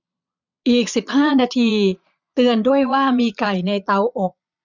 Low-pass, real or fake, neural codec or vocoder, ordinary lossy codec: 7.2 kHz; fake; vocoder, 22.05 kHz, 80 mel bands, Vocos; none